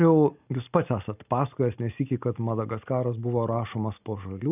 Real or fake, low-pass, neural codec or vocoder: real; 3.6 kHz; none